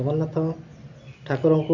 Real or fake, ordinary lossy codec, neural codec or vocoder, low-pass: real; none; none; 7.2 kHz